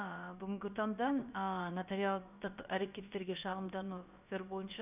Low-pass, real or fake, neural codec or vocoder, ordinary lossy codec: 3.6 kHz; fake; codec, 16 kHz, about 1 kbps, DyCAST, with the encoder's durations; none